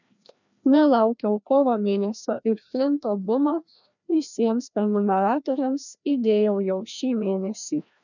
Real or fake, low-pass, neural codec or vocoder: fake; 7.2 kHz; codec, 16 kHz, 1 kbps, FreqCodec, larger model